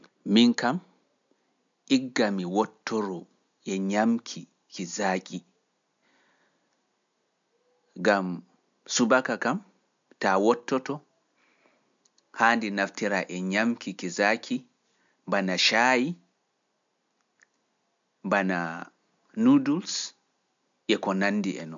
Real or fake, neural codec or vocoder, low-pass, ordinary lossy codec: real; none; 7.2 kHz; MP3, 64 kbps